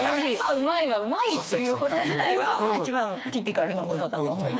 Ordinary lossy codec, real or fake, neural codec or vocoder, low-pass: none; fake; codec, 16 kHz, 2 kbps, FreqCodec, smaller model; none